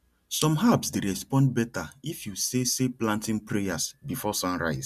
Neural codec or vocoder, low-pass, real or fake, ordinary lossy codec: none; 14.4 kHz; real; MP3, 96 kbps